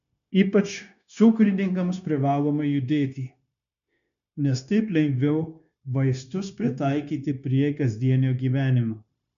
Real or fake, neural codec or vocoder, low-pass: fake; codec, 16 kHz, 0.9 kbps, LongCat-Audio-Codec; 7.2 kHz